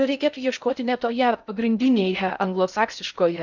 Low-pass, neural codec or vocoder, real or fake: 7.2 kHz; codec, 16 kHz in and 24 kHz out, 0.6 kbps, FocalCodec, streaming, 2048 codes; fake